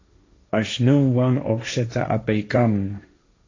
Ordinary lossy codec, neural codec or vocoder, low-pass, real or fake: AAC, 32 kbps; codec, 16 kHz, 1.1 kbps, Voila-Tokenizer; 7.2 kHz; fake